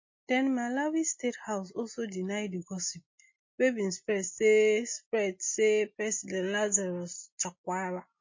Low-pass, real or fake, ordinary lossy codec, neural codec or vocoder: 7.2 kHz; real; MP3, 32 kbps; none